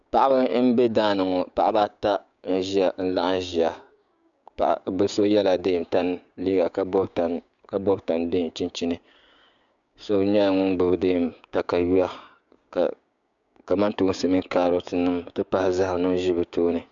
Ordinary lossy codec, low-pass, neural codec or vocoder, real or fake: MP3, 96 kbps; 7.2 kHz; codec, 16 kHz, 6 kbps, DAC; fake